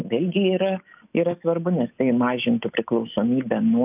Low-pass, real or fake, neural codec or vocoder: 3.6 kHz; real; none